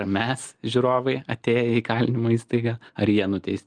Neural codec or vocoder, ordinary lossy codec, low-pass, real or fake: none; Opus, 32 kbps; 9.9 kHz; real